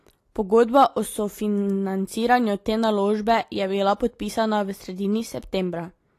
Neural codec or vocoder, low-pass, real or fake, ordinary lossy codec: none; 14.4 kHz; real; AAC, 48 kbps